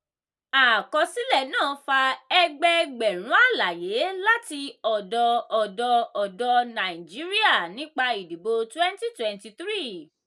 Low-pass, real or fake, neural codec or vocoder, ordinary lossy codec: none; real; none; none